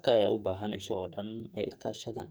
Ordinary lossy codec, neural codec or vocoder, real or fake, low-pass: none; codec, 44.1 kHz, 2.6 kbps, SNAC; fake; none